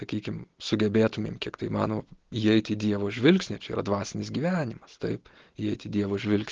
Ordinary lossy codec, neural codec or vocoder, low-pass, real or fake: Opus, 16 kbps; none; 7.2 kHz; real